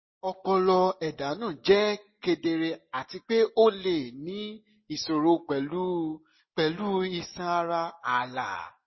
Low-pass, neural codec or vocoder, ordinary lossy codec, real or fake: 7.2 kHz; none; MP3, 24 kbps; real